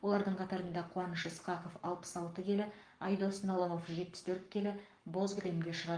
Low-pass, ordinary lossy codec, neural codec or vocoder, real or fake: 9.9 kHz; Opus, 32 kbps; codec, 44.1 kHz, 7.8 kbps, Pupu-Codec; fake